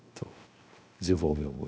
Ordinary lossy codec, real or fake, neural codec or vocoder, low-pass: none; fake; codec, 16 kHz, 0.7 kbps, FocalCodec; none